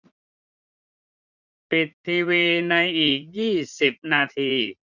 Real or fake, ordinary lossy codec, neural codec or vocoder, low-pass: fake; none; vocoder, 44.1 kHz, 80 mel bands, Vocos; 7.2 kHz